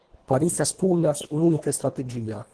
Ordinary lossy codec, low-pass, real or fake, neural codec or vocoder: Opus, 16 kbps; 10.8 kHz; fake; codec, 24 kHz, 1.5 kbps, HILCodec